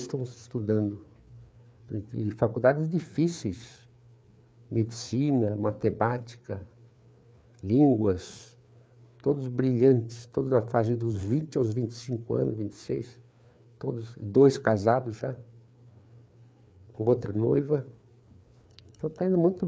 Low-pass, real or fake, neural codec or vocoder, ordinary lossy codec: none; fake; codec, 16 kHz, 4 kbps, FreqCodec, larger model; none